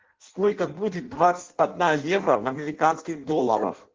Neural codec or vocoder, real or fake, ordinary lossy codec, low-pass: codec, 16 kHz in and 24 kHz out, 0.6 kbps, FireRedTTS-2 codec; fake; Opus, 16 kbps; 7.2 kHz